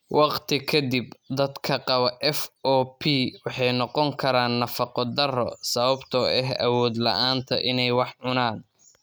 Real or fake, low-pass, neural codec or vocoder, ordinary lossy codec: real; none; none; none